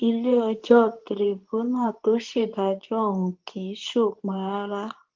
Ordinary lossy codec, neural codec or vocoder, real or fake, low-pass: Opus, 32 kbps; codec, 24 kHz, 0.9 kbps, WavTokenizer, medium speech release version 2; fake; 7.2 kHz